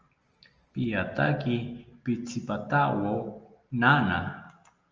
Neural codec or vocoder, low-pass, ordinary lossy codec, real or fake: none; 7.2 kHz; Opus, 24 kbps; real